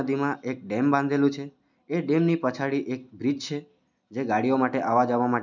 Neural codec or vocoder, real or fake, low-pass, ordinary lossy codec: none; real; 7.2 kHz; none